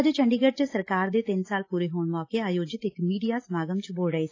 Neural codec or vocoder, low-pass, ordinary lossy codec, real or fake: none; 7.2 kHz; AAC, 32 kbps; real